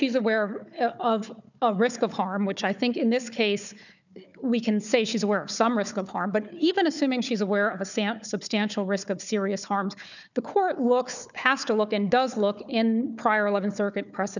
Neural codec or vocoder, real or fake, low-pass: codec, 16 kHz, 4 kbps, FunCodec, trained on Chinese and English, 50 frames a second; fake; 7.2 kHz